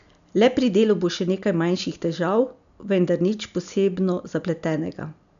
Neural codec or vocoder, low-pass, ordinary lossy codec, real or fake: none; 7.2 kHz; none; real